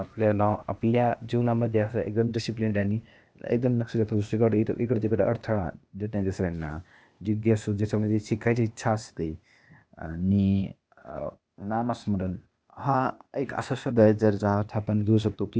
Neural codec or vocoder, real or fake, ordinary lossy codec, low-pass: codec, 16 kHz, 0.8 kbps, ZipCodec; fake; none; none